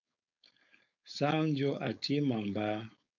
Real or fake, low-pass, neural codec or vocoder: fake; 7.2 kHz; codec, 16 kHz, 4.8 kbps, FACodec